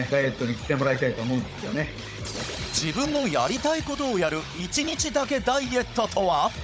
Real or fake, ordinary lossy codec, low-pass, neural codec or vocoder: fake; none; none; codec, 16 kHz, 16 kbps, FunCodec, trained on Chinese and English, 50 frames a second